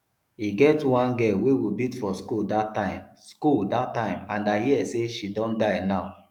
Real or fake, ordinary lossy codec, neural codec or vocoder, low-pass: fake; none; codec, 44.1 kHz, 7.8 kbps, DAC; 19.8 kHz